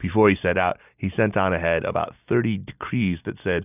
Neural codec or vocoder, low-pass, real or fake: none; 3.6 kHz; real